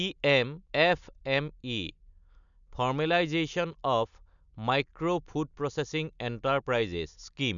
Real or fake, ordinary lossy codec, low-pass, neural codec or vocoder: real; none; 7.2 kHz; none